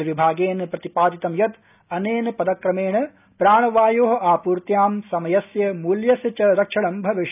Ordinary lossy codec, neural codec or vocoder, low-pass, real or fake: none; none; 3.6 kHz; real